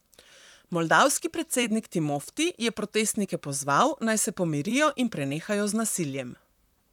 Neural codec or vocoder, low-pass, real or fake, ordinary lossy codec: vocoder, 44.1 kHz, 128 mel bands, Pupu-Vocoder; 19.8 kHz; fake; none